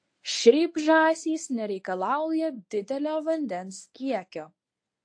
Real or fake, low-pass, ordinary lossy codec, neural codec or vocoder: fake; 9.9 kHz; AAC, 48 kbps; codec, 24 kHz, 0.9 kbps, WavTokenizer, medium speech release version 1